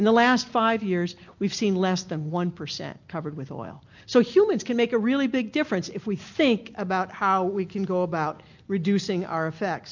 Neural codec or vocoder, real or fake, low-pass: none; real; 7.2 kHz